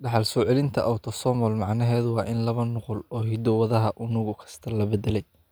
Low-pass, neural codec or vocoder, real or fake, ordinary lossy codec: none; none; real; none